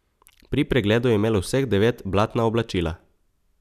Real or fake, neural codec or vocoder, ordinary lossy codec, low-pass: real; none; none; 14.4 kHz